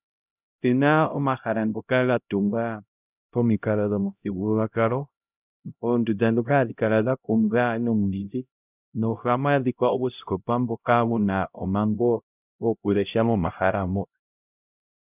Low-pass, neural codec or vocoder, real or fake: 3.6 kHz; codec, 16 kHz, 0.5 kbps, X-Codec, HuBERT features, trained on LibriSpeech; fake